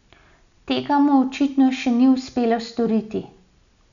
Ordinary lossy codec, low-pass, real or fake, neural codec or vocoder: none; 7.2 kHz; real; none